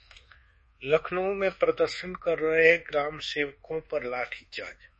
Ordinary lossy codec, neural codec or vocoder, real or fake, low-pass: MP3, 32 kbps; codec, 24 kHz, 1.2 kbps, DualCodec; fake; 10.8 kHz